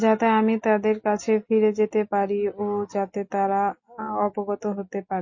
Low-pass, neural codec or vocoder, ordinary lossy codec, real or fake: 7.2 kHz; none; MP3, 32 kbps; real